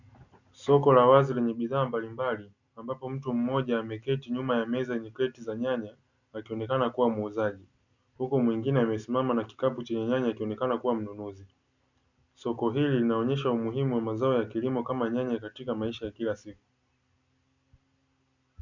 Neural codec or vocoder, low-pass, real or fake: none; 7.2 kHz; real